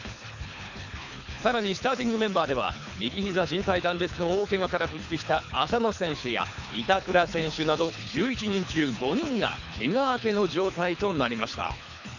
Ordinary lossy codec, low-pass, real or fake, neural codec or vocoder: none; 7.2 kHz; fake; codec, 24 kHz, 3 kbps, HILCodec